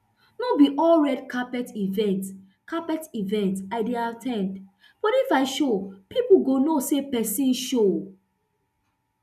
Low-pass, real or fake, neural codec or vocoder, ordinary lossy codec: 14.4 kHz; real; none; none